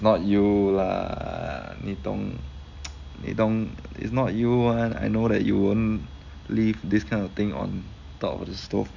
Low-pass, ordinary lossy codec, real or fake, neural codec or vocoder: 7.2 kHz; none; real; none